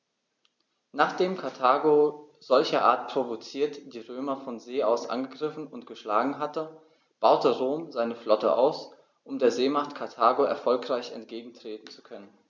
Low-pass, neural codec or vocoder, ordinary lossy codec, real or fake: none; none; none; real